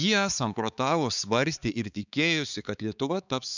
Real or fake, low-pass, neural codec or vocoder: fake; 7.2 kHz; codec, 16 kHz, 4 kbps, X-Codec, HuBERT features, trained on balanced general audio